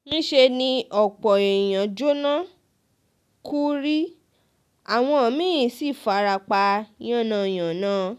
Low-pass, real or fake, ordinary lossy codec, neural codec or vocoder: 14.4 kHz; real; none; none